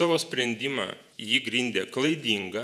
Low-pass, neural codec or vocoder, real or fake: 14.4 kHz; vocoder, 48 kHz, 128 mel bands, Vocos; fake